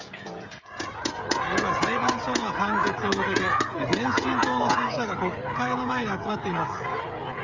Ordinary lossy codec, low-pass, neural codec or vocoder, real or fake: Opus, 32 kbps; 7.2 kHz; codec, 16 kHz, 16 kbps, FreqCodec, smaller model; fake